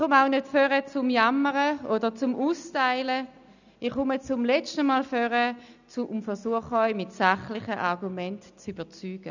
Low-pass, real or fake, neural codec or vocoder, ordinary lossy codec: 7.2 kHz; real; none; none